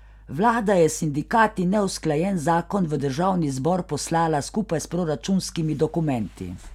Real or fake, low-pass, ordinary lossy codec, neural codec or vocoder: real; 19.8 kHz; none; none